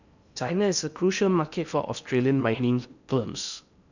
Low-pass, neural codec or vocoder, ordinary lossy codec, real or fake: 7.2 kHz; codec, 16 kHz in and 24 kHz out, 0.8 kbps, FocalCodec, streaming, 65536 codes; none; fake